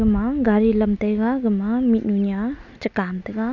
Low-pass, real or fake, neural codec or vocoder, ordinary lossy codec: 7.2 kHz; real; none; none